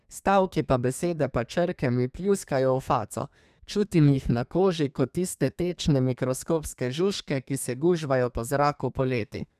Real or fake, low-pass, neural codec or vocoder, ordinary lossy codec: fake; 14.4 kHz; codec, 32 kHz, 1.9 kbps, SNAC; none